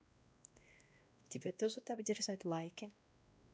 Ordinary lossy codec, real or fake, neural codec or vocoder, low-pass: none; fake; codec, 16 kHz, 1 kbps, X-Codec, WavLM features, trained on Multilingual LibriSpeech; none